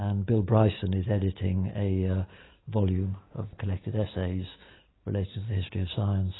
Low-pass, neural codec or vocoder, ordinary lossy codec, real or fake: 7.2 kHz; none; AAC, 16 kbps; real